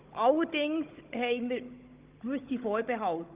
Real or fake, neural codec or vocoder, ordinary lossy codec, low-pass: fake; codec, 16 kHz, 16 kbps, FunCodec, trained on Chinese and English, 50 frames a second; Opus, 32 kbps; 3.6 kHz